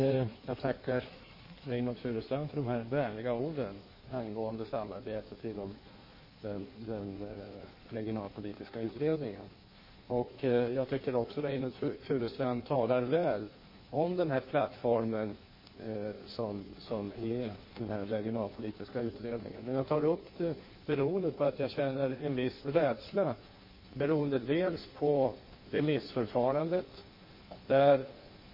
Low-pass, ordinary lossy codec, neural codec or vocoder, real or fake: 5.4 kHz; MP3, 24 kbps; codec, 16 kHz in and 24 kHz out, 1.1 kbps, FireRedTTS-2 codec; fake